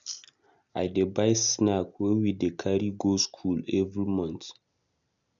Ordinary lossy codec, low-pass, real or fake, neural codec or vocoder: none; 7.2 kHz; real; none